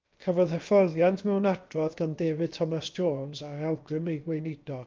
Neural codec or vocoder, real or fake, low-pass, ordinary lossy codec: codec, 16 kHz, about 1 kbps, DyCAST, with the encoder's durations; fake; 7.2 kHz; Opus, 32 kbps